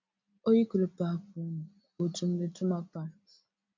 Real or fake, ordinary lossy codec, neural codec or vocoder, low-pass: real; MP3, 64 kbps; none; 7.2 kHz